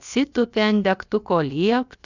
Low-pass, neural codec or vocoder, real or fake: 7.2 kHz; codec, 16 kHz, about 1 kbps, DyCAST, with the encoder's durations; fake